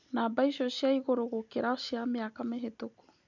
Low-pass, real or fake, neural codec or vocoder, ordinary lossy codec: 7.2 kHz; real; none; none